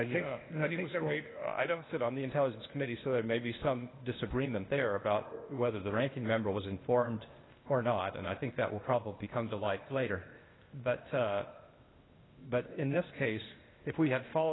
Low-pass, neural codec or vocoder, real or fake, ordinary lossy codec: 7.2 kHz; codec, 16 kHz, 0.8 kbps, ZipCodec; fake; AAC, 16 kbps